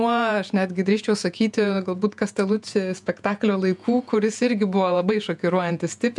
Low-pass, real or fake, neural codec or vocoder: 10.8 kHz; fake; vocoder, 48 kHz, 128 mel bands, Vocos